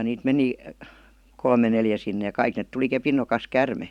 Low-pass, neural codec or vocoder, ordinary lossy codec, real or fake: 19.8 kHz; vocoder, 44.1 kHz, 128 mel bands every 512 samples, BigVGAN v2; none; fake